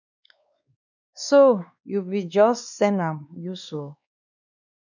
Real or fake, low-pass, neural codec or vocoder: fake; 7.2 kHz; codec, 16 kHz, 2 kbps, X-Codec, WavLM features, trained on Multilingual LibriSpeech